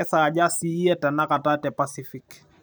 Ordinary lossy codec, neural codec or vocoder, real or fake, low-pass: none; none; real; none